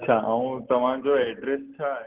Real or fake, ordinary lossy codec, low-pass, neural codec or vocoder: real; Opus, 16 kbps; 3.6 kHz; none